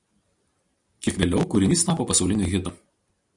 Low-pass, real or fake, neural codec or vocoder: 10.8 kHz; real; none